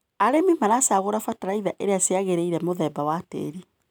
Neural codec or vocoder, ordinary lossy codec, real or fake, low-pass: none; none; real; none